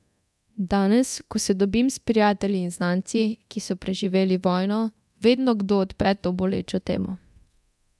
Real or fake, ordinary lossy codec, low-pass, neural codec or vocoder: fake; none; none; codec, 24 kHz, 0.9 kbps, DualCodec